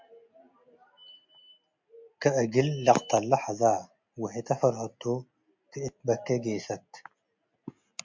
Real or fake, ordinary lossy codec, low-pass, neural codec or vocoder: real; MP3, 64 kbps; 7.2 kHz; none